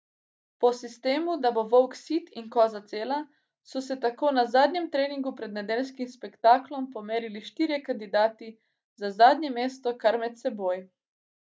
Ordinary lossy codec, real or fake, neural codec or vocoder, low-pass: none; real; none; none